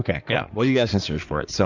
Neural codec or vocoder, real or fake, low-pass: codec, 16 kHz in and 24 kHz out, 2.2 kbps, FireRedTTS-2 codec; fake; 7.2 kHz